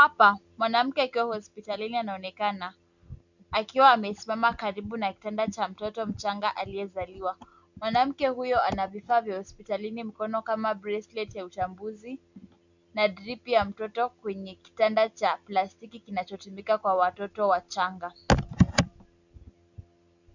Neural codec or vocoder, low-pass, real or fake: none; 7.2 kHz; real